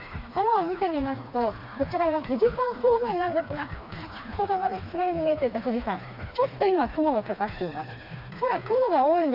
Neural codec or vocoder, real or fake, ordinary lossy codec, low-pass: codec, 16 kHz, 2 kbps, FreqCodec, smaller model; fake; none; 5.4 kHz